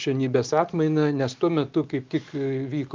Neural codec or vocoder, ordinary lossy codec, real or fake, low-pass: none; Opus, 32 kbps; real; 7.2 kHz